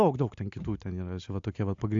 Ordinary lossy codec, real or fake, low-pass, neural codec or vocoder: AAC, 64 kbps; real; 7.2 kHz; none